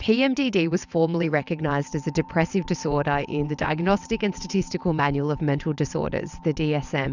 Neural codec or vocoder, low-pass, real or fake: vocoder, 22.05 kHz, 80 mel bands, WaveNeXt; 7.2 kHz; fake